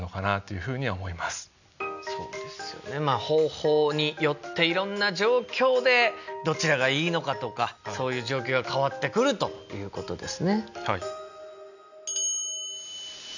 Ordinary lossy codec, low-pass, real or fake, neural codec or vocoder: none; 7.2 kHz; real; none